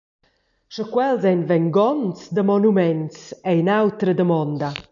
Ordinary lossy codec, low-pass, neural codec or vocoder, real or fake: MP3, 96 kbps; 7.2 kHz; none; real